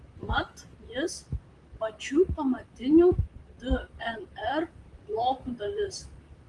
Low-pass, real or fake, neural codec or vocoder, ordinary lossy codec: 10.8 kHz; fake; vocoder, 44.1 kHz, 128 mel bands, Pupu-Vocoder; Opus, 32 kbps